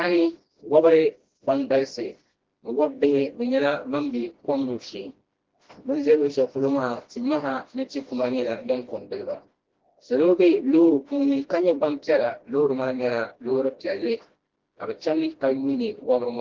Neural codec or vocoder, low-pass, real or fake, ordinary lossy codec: codec, 16 kHz, 1 kbps, FreqCodec, smaller model; 7.2 kHz; fake; Opus, 16 kbps